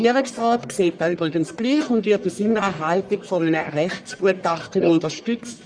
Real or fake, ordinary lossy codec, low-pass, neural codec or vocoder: fake; none; 9.9 kHz; codec, 44.1 kHz, 1.7 kbps, Pupu-Codec